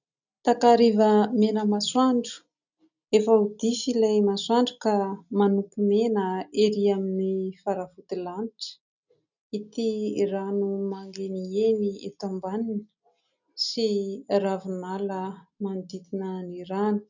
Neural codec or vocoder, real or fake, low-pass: none; real; 7.2 kHz